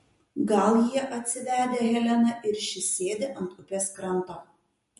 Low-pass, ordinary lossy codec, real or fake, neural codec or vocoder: 14.4 kHz; MP3, 48 kbps; real; none